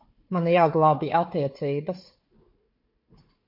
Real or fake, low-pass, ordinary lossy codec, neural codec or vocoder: fake; 5.4 kHz; MP3, 32 kbps; codec, 16 kHz, 4 kbps, FunCodec, trained on Chinese and English, 50 frames a second